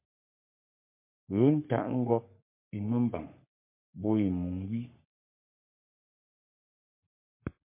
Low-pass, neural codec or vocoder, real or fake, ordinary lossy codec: 3.6 kHz; codec, 44.1 kHz, 3.4 kbps, Pupu-Codec; fake; MP3, 24 kbps